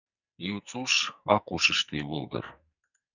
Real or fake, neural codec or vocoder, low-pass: fake; codec, 44.1 kHz, 2.6 kbps, SNAC; 7.2 kHz